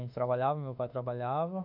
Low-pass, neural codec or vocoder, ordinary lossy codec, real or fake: 5.4 kHz; autoencoder, 48 kHz, 32 numbers a frame, DAC-VAE, trained on Japanese speech; none; fake